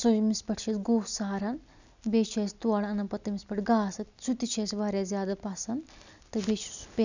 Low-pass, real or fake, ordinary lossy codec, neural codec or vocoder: 7.2 kHz; real; none; none